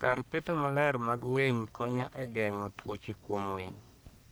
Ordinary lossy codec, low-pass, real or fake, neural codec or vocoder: none; none; fake; codec, 44.1 kHz, 1.7 kbps, Pupu-Codec